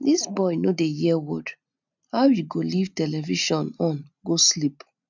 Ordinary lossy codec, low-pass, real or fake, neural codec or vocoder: none; 7.2 kHz; real; none